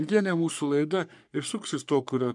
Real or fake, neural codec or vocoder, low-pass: fake; codec, 44.1 kHz, 3.4 kbps, Pupu-Codec; 10.8 kHz